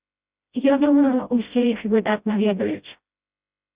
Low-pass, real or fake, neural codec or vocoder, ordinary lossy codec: 3.6 kHz; fake; codec, 16 kHz, 0.5 kbps, FreqCodec, smaller model; Opus, 64 kbps